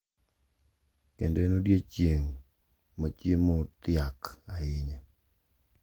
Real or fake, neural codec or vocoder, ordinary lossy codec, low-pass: real; none; Opus, 24 kbps; 19.8 kHz